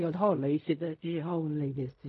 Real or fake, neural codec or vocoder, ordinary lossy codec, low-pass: fake; codec, 16 kHz in and 24 kHz out, 0.4 kbps, LongCat-Audio-Codec, fine tuned four codebook decoder; AAC, 64 kbps; 10.8 kHz